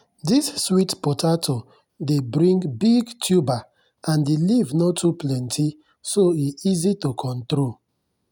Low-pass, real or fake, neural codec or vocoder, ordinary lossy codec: 19.8 kHz; real; none; none